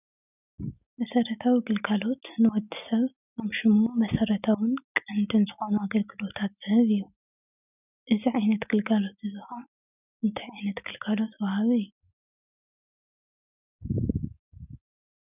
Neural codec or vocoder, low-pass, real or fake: none; 3.6 kHz; real